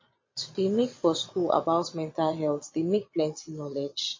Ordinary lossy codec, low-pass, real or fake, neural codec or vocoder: MP3, 32 kbps; 7.2 kHz; fake; vocoder, 22.05 kHz, 80 mel bands, Vocos